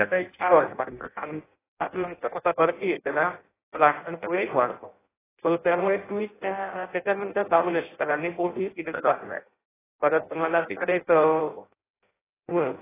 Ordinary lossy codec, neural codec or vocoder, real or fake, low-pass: AAC, 16 kbps; codec, 16 kHz in and 24 kHz out, 0.6 kbps, FireRedTTS-2 codec; fake; 3.6 kHz